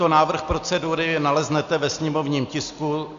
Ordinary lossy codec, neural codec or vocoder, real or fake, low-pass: Opus, 64 kbps; none; real; 7.2 kHz